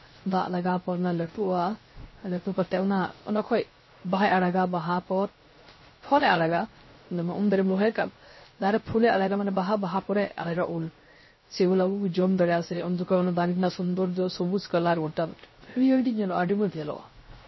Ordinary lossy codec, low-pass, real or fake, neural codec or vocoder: MP3, 24 kbps; 7.2 kHz; fake; codec, 16 kHz, 0.3 kbps, FocalCodec